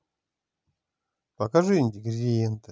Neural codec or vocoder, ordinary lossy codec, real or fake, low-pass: none; none; real; none